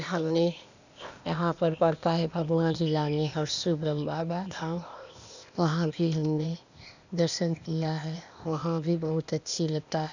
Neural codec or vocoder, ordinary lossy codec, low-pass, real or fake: codec, 16 kHz, 0.8 kbps, ZipCodec; none; 7.2 kHz; fake